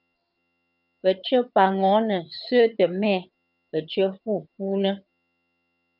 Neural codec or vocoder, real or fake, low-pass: vocoder, 22.05 kHz, 80 mel bands, HiFi-GAN; fake; 5.4 kHz